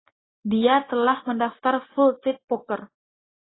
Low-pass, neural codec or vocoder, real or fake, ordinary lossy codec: 7.2 kHz; none; real; AAC, 16 kbps